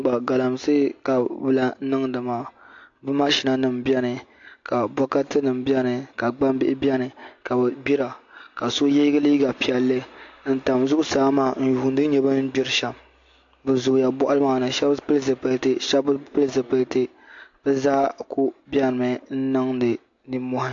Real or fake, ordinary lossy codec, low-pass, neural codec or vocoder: real; AAC, 48 kbps; 7.2 kHz; none